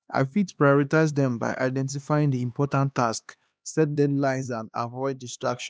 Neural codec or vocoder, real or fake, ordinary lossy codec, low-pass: codec, 16 kHz, 2 kbps, X-Codec, HuBERT features, trained on LibriSpeech; fake; none; none